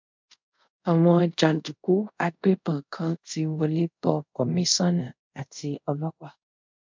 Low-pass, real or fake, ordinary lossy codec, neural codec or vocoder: 7.2 kHz; fake; MP3, 64 kbps; codec, 24 kHz, 0.5 kbps, DualCodec